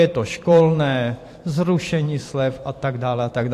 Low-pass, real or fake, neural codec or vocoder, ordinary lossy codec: 14.4 kHz; fake; vocoder, 44.1 kHz, 128 mel bands every 512 samples, BigVGAN v2; AAC, 64 kbps